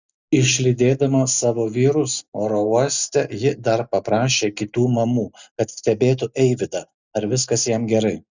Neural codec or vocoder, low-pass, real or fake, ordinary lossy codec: none; 7.2 kHz; real; Opus, 64 kbps